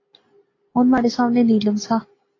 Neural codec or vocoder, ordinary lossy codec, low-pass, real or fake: none; AAC, 32 kbps; 7.2 kHz; real